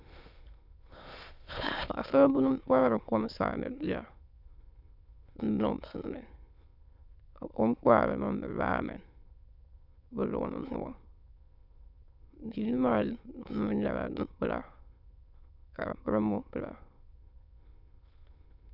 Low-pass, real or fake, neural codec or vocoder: 5.4 kHz; fake; autoencoder, 22.05 kHz, a latent of 192 numbers a frame, VITS, trained on many speakers